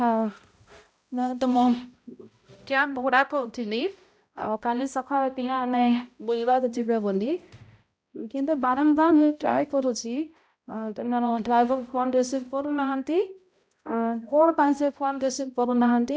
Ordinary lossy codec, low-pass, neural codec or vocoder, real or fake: none; none; codec, 16 kHz, 0.5 kbps, X-Codec, HuBERT features, trained on balanced general audio; fake